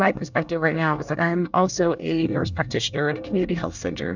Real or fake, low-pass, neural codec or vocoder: fake; 7.2 kHz; codec, 24 kHz, 1 kbps, SNAC